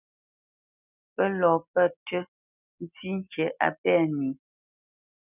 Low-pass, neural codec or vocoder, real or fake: 3.6 kHz; none; real